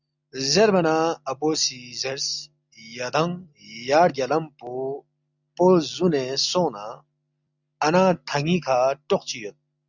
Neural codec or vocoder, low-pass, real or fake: none; 7.2 kHz; real